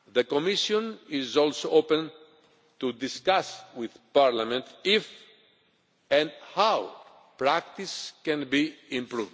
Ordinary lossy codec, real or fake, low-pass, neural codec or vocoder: none; real; none; none